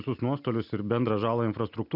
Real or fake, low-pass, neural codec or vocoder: real; 5.4 kHz; none